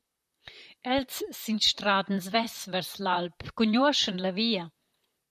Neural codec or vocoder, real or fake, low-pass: vocoder, 44.1 kHz, 128 mel bands, Pupu-Vocoder; fake; 14.4 kHz